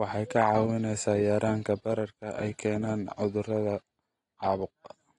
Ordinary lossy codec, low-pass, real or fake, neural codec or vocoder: AAC, 32 kbps; 10.8 kHz; real; none